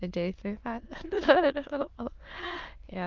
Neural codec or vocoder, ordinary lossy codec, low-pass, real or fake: autoencoder, 22.05 kHz, a latent of 192 numbers a frame, VITS, trained on many speakers; Opus, 24 kbps; 7.2 kHz; fake